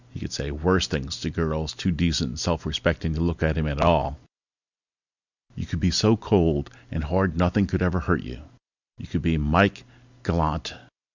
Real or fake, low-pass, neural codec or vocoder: real; 7.2 kHz; none